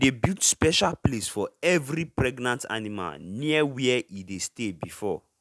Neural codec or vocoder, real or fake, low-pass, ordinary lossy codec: none; real; none; none